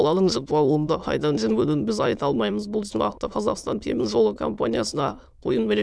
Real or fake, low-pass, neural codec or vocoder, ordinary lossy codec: fake; none; autoencoder, 22.05 kHz, a latent of 192 numbers a frame, VITS, trained on many speakers; none